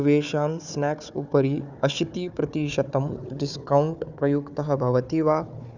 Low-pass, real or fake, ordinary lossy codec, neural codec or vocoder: 7.2 kHz; fake; none; codec, 16 kHz, 4 kbps, FunCodec, trained on Chinese and English, 50 frames a second